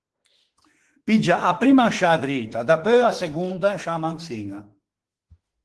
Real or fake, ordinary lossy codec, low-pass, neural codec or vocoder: fake; Opus, 16 kbps; 10.8 kHz; autoencoder, 48 kHz, 32 numbers a frame, DAC-VAE, trained on Japanese speech